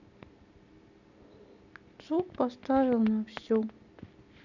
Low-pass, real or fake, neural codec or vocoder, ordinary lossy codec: 7.2 kHz; real; none; none